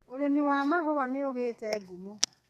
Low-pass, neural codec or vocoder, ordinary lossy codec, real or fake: 14.4 kHz; codec, 32 kHz, 1.9 kbps, SNAC; none; fake